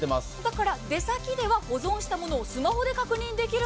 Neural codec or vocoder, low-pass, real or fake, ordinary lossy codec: none; none; real; none